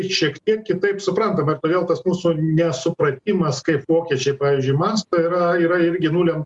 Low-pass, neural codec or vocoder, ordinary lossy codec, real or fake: 10.8 kHz; none; Opus, 64 kbps; real